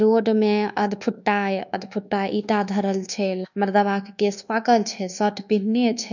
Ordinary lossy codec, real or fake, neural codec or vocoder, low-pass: none; fake; codec, 24 kHz, 1.2 kbps, DualCodec; 7.2 kHz